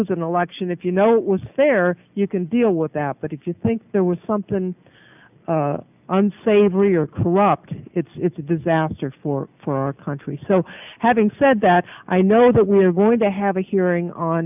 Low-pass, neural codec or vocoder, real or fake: 3.6 kHz; none; real